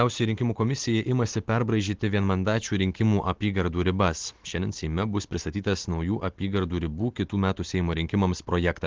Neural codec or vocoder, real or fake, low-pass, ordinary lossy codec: none; real; 7.2 kHz; Opus, 16 kbps